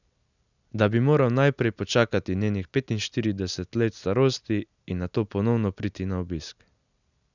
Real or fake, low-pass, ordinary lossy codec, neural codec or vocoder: real; 7.2 kHz; none; none